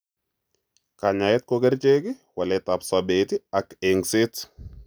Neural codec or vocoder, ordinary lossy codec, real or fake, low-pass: none; none; real; none